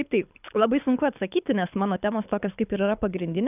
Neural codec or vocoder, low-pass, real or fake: codec, 24 kHz, 6 kbps, HILCodec; 3.6 kHz; fake